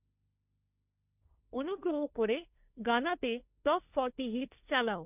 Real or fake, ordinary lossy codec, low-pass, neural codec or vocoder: fake; none; 3.6 kHz; codec, 16 kHz, 1.1 kbps, Voila-Tokenizer